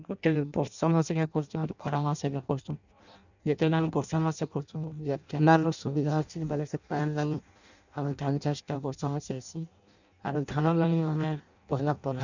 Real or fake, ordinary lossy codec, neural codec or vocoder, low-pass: fake; none; codec, 16 kHz in and 24 kHz out, 0.6 kbps, FireRedTTS-2 codec; 7.2 kHz